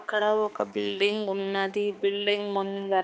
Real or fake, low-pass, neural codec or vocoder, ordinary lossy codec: fake; none; codec, 16 kHz, 2 kbps, X-Codec, HuBERT features, trained on balanced general audio; none